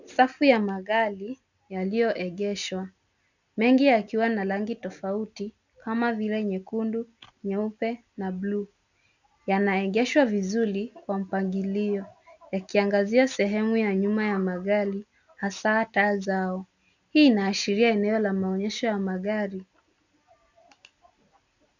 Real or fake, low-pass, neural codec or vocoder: real; 7.2 kHz; none